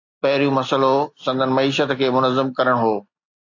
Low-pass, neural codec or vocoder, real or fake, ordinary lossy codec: 7.2 kHz; none; real; AAC, 48 kbps